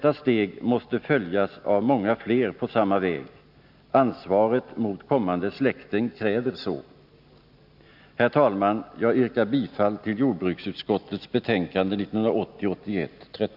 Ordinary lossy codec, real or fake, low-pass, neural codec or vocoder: none; real; 5.4 kHz; none